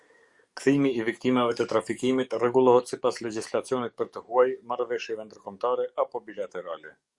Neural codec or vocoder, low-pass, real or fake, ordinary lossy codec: codec, 44.1 kHz, 7.8 kbps, DAC; 10.8 kHz; fake; Opus, 64 kbps